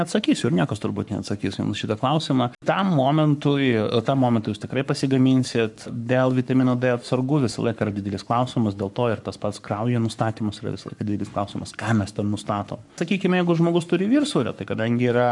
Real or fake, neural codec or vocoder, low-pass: fake; codec, 44.1 kHz, 7.8 kbps, Pupu-Codec; 10.8 kHz